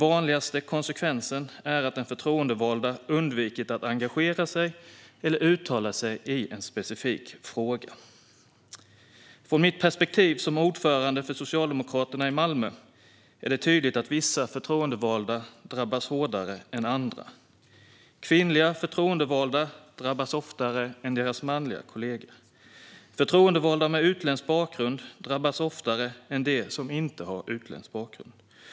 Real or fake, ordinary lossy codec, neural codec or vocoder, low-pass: real; none; none; none